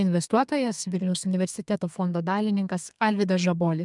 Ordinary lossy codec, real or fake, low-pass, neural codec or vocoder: MP3, 96 kbps; fake; 10.8 kHz; codec, 32 kHz, 1.9 kbps, SNAC